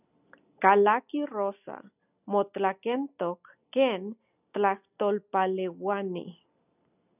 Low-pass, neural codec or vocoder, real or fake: 3.6 kHz; none; real